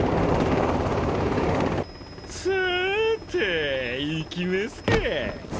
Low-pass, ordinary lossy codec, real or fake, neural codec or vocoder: none; none; real; none